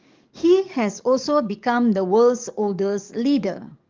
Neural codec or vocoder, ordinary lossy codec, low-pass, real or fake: codec, 44.1 kHz, 7.8 kbps, DAC; Opus, 24 kbps; 7.2 kHz; fake